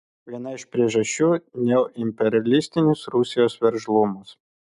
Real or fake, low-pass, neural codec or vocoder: real; 10.8 kHz; none